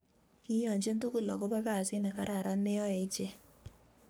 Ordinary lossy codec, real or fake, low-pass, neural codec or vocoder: none; fake; none; codec, 44.1 kHz, 3.4 kbps, Pupu-Codec